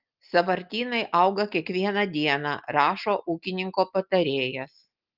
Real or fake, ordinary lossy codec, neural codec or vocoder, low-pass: real; Opus, 32 kbps; none; 5.4 kHz